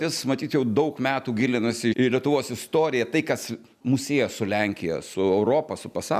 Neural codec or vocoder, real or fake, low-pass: none; real; 14.4 kHz